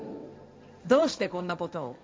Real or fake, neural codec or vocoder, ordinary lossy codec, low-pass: fake; codec, 16 kHz, 1.1 kbps, Voila-Tokenizer; none; none